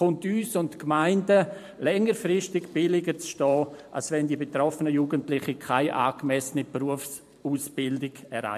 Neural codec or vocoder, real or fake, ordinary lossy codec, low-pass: vocoder, 44.1 kHz, 128 mel bands every 512 samples, BigVGAN v2; fake; MP3, 64 kbps; 14.4 kHz